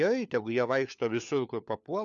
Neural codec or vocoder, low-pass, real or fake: codec, 16 kHz, 4 kbps, FunCodec, trained on LibriTTS, 50 frames a second; 7.2 kHz; fake